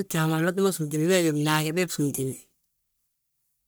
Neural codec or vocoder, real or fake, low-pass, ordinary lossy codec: codec, 44.1 kHz, 1.7 kbps, Pupu-Codec; fake; none; none